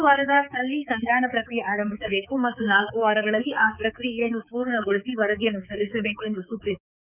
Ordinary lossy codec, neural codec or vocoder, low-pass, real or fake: none; codec, 16 kHz, 4 kbps, X-Codec, HuBERT features, trained on balanced general audio; 3.6 kHz; fake